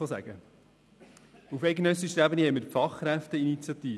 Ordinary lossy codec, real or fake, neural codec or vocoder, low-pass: none; real; none; none